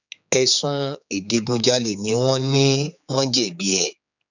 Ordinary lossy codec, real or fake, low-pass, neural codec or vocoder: none; fake; 7.2 kHz; codec, 16 kHz, 4 kbps, X-Codec, HuBERT features, trained on general audio